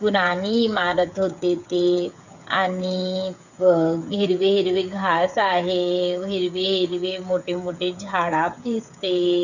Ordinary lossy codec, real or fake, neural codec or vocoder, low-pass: none; fake; codec, 16 kHz, 8 kbps, FreqCodec, smaller model; 7.2 kHz